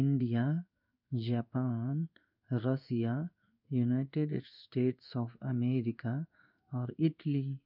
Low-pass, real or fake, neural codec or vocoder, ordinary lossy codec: 5.4 kHz; fake; codec, 16 kHz in and 24 kHz out, 1 kbps, XY-Tokenizer; none